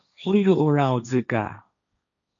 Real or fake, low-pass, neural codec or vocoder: fake; 7.2 kHz; codec, 16 kHz, 1.1 kbps, Voila-Tokenizer